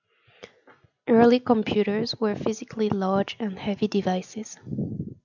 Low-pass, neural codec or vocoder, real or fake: 7.2 kHz; none; real